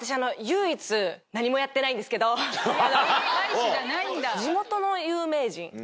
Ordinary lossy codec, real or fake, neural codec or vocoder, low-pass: none; real; none; none